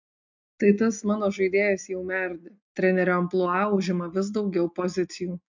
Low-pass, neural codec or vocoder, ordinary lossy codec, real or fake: 7.2 kHz; none; MP3, 64 kbps; real